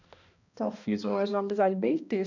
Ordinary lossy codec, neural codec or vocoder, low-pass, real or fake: none; codec, 16 kHz, 1 kbps, X-Codec, HuBERT features, trained on balanced general audio; 7.2 kHz; fake